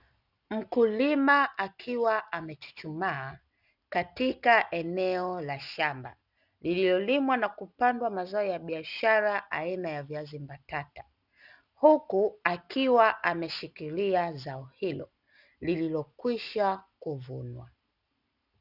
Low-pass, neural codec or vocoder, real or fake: 5.4 kHz; none; real